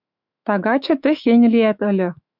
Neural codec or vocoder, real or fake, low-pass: autoencoder, 48 kHz, 128 numbers a frame, DAC-VAE, trained on Japanese speech; fake; 5.4 kHz